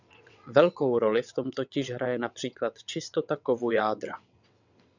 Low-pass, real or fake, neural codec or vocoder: 7.2 kHz; fake; vocoder, 22.05 kHz, 80 mel bands, WaveNeXt